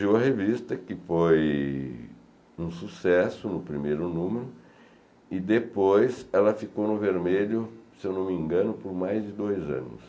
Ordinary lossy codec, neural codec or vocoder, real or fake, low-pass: none; none; real; none